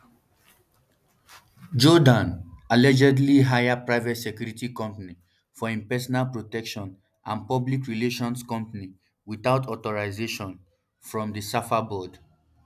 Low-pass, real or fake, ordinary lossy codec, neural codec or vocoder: 14.4 kHz; real; none; none